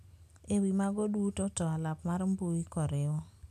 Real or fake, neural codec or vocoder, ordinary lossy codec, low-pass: real; none; AAC, 96 kbps; 14.4 kHz